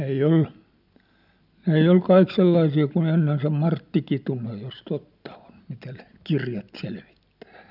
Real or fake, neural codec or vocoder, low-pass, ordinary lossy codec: real; none; 5.4 kHz; none